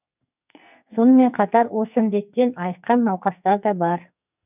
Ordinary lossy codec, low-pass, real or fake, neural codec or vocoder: none; 3.6 kHz; fake; codec, 44.1 kHz, 2.6 kbps, SNAC